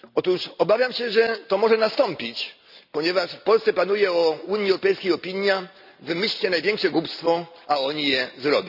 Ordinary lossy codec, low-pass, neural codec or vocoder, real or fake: none; 5.4 kHz; none; real